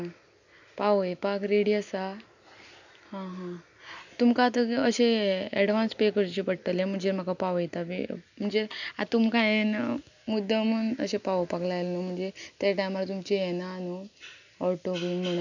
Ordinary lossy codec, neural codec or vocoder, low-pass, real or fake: none; none; 7.2 kHz; real